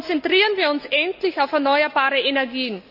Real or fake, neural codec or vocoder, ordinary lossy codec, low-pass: real; none; none; 5.4 kHz